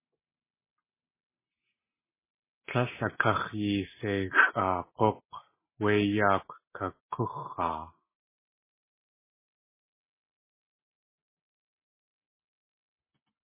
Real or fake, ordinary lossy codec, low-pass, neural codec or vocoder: real; MP3, 16 kbps; 3.6 kHz; none